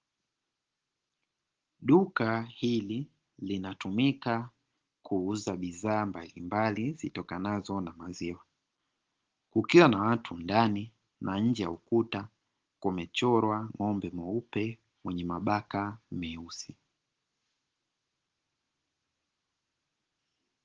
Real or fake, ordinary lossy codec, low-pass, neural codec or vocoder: real; Opus, 16 kbps; 7.2 kHz; none